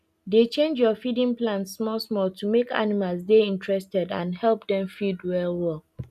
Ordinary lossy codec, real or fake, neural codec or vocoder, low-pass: none; real; none; 14.4 kHz